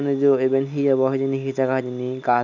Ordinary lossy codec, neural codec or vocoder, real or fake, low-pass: none; none; real; 7.2 kHz